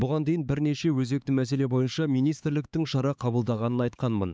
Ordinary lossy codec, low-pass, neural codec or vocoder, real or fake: none; none; codec, 16 kHz, 4 kbps, X-Codec, HuBERT features, trained on LibriSpeech; fake